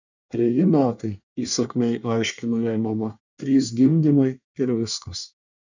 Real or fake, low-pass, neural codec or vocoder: fake; 7.2 kHz; codec, 24 kHz, 1 kbps, SNAC